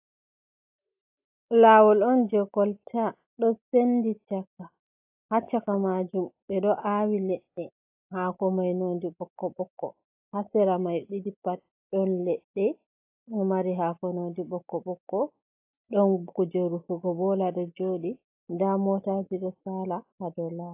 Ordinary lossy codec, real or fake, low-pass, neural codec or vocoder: AAC, 32 kbps; real; 3.6 kHz; none